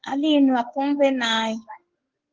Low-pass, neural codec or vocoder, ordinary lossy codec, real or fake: 7.2 kHz; codec, 16 kHz in and 24 kHz out, 1 kbps, XY-Tokenizer; Opus, 16 kbps; fake